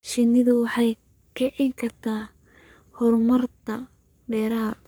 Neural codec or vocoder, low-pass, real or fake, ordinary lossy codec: codec, 44.1 kHz, 3.4 kbps, Pupu-Codec; none; fake; none